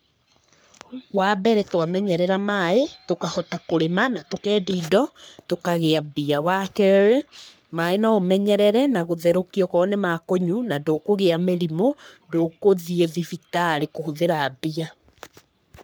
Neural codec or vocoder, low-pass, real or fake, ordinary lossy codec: codec, 44.1 kHz, 3.4 kbps, Pupu-Codec; none; fake; none